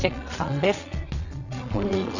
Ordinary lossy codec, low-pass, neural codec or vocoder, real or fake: none; 7.2 kHz; vocoder, 22.05 kHz, 80 mel bands, Vocos; fake